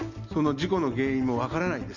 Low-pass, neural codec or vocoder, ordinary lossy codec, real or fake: 7.2 kHz; none; Opus, 64 kbps; real